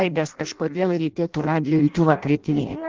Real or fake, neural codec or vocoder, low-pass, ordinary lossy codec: fake; codec, 16 kHz in and 24 kHz out, 0.6 kbps, FireRedTTS-2 codec; 7.2 kHz; Opus, 32 kbps